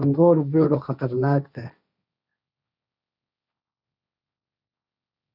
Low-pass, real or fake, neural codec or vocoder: 5.4 kHz; fake; codec, 16 kHz, 1.1 kbps, Voila-Tokenizer